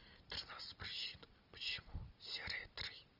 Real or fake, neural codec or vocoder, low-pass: real; none; 5.4 kHz